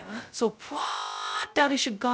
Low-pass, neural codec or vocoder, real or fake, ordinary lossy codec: none; codec, 16 kHz, 0.2 kbps, FocalCodec; fake; none